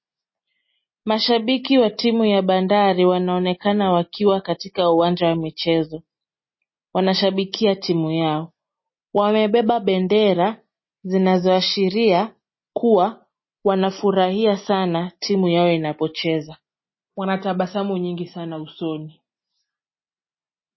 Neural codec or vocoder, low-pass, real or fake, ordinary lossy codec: none; 7.2 kHz; real; MP3, 24 kbps